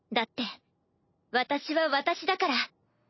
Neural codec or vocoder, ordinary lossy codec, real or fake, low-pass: none; MP3, 24 kbps; real; 7.2 kHz